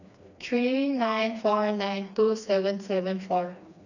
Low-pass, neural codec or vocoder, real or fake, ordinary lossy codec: 7.2 kHz; codec, 16 kHz, 2 kbps, FreqCodec, smaller model; fake; none